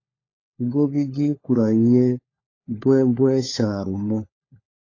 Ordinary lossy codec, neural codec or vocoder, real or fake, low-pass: AAC, 32 kbps; codec, 16 kHz, 4 kbps, FunCodec, trained on LibriTTS, 50 frames a second; fake; 7.2 kHz